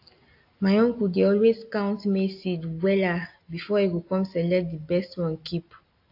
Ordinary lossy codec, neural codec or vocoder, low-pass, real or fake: AAC, 32 kbps; none; 5.4 kHz; real